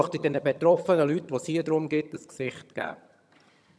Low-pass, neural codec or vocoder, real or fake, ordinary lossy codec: none; vocoder, 22.05 kHz, 80 mel bands, HiFi-GAN; fake; none